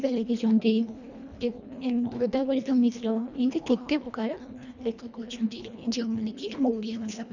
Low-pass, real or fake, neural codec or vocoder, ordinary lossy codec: 7.2 kHz; fake; codec, 24 kHz, 1.5 kbps, HILCodec; none